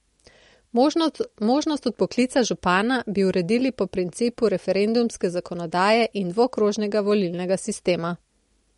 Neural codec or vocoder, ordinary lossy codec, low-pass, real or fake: none; MP3, 48 kbps; 19.8 kHz; real